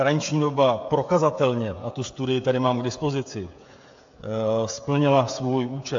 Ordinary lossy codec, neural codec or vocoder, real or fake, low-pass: AAC, 48 kbps; codec, 16 kHz, 16 kbps, FreqCodec, smaller model; fake; 7.2 kHz